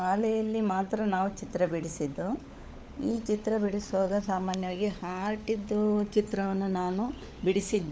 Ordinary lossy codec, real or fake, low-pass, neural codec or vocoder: none; fake; none; codec, 16 kHz, 16 kbps, FunCodec, trained on LibriTTS, 50 frames a second